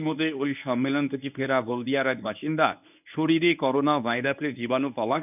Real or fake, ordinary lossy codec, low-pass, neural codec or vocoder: fake; none; 3.6 kHz; codec, 24 kHz, 0.9 kbps, WavTokenizer, medium speech release version 1